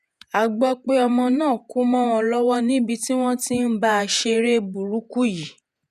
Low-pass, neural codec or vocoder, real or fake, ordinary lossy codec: 14.4 kHz; vocoder, 48 kHz, 128 mel bands, Vocos; fake; none